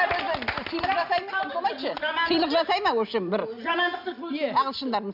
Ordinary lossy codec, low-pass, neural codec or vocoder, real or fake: none; 5.4 kHz; none; real